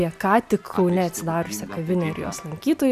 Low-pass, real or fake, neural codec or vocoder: 14.4 kHz; real; none